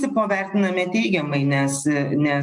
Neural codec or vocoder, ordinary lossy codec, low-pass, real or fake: none; MP3, 96 kbps; 10.8 kHz; real